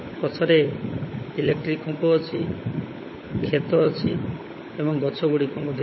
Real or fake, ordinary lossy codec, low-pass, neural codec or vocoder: fake; MP3, 24 kbps; 7.2 kHz; codec, 16 kHz, 16 kbps, FunCodec, trained on LibriTTS, 50 frames a second